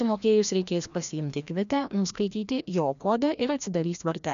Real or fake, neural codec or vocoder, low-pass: fake; codec, 16 kHz, 1 kbps, FreqCodec, larger model; 7.2 kHz